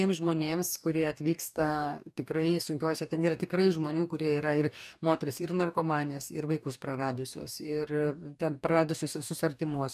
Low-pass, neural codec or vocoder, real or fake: 14.4 kHz; codec, 44.1 kHz, 2.6 kbps, DAC; fake